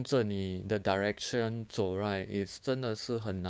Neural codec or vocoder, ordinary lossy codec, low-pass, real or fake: codec, 16 kHz, 6 kbps, DAC; none; none; fake